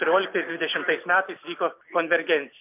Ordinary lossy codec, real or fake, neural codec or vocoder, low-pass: MP3, 32 kbps; real; none; 3.6 kHz